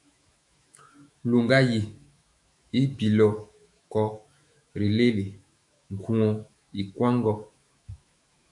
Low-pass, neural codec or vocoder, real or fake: 10.8 kHz; autoencoder, 48 kHz, 128 numbers a frame, DAC-VAE, trained on Japanese speech; fake